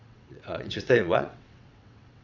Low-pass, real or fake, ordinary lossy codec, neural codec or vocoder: 7.2 kHz; fake; none; vocoder, 22.05 kHz, 80 mel bands, WaveNeXt